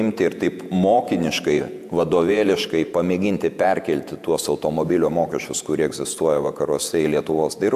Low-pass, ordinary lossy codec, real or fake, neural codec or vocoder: 14.4 kHz; AAC, 96 kbps; fake; vocoder, 48 kHz, 128 mel bands, Vocos